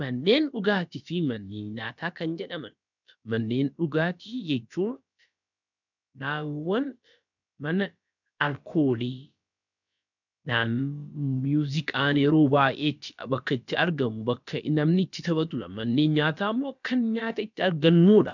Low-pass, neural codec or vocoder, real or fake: 7.2 kHz; codec, 16 kHz, about 1 kbps, DyCAST, with the encoder's durations; fake